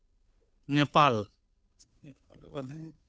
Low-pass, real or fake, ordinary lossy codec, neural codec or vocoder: none; fake; none; codec, 16 kHz, 2 kbps, FunCodec, trained on Chinese and English, 25 frames a second